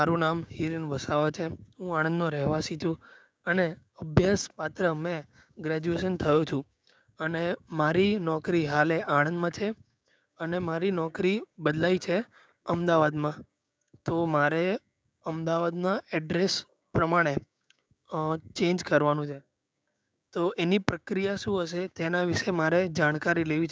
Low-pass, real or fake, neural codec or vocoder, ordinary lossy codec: none; fake; codec, 16 kHz, 6 kbps, DAC; none